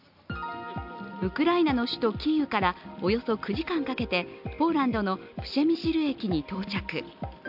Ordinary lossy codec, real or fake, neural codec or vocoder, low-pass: AAC, 48 kbps; real; none; 5.4 kHz